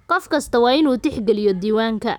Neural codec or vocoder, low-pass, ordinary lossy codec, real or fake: autoencoder, 48 kHz, 128 numbers a frame, DAC-VAE, trained on Japanese speech; 19.8 kHz; none; fake